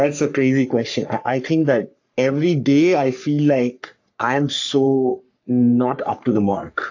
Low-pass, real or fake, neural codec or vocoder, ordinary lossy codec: 7.2 kHz; fake; codec, 44.1 kHz, 3.4 kbps, Pupu-Codec; AAC, 48 kbps